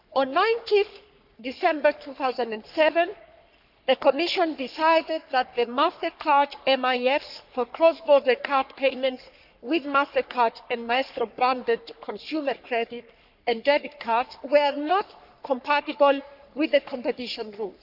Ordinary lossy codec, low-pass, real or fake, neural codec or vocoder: none; 5.4 kHz; fake; codec, 44.1 kHz, 3.4 kbps, Pupu-Codec